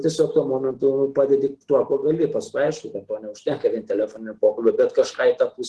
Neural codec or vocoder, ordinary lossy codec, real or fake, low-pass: none; Opus, 16 kbps; real; 10.8 kHz